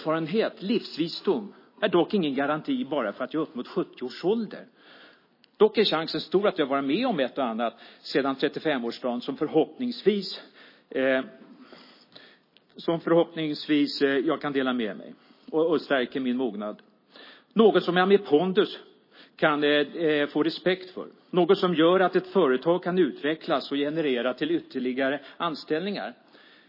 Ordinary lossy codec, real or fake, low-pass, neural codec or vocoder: MP3, 24 kbps; real; 5.4 kHz; none